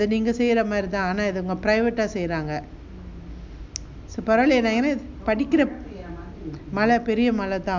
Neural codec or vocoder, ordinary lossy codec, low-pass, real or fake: none; MP3, 64 kbps; 7.2 kHz; real